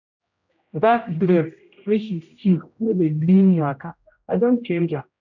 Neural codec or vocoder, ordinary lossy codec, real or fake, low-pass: codec, 16 kHz, 0.5 kbps, X-Codec, HuBERT features, trained on general audio; none; fake; 7.2 kHz